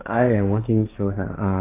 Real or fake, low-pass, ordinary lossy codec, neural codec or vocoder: fake; 3.6 kHz; AAC, 24 kbps; codec, 16 kHz, 1.1 kbps, Voila-Tokenizer